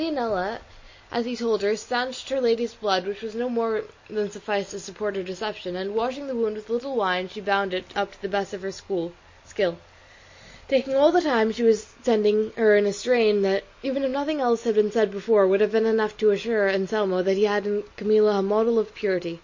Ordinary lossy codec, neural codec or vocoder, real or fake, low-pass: MP3, 32 kbps; none; real; 7.2 kHz